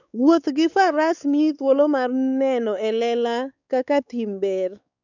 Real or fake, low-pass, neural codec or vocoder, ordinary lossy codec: fake; 7.2 kHz; codec, 16 kHz, 4 kbps, X-Codec, WavLM features, trained on Multilingual LibriSpeech; none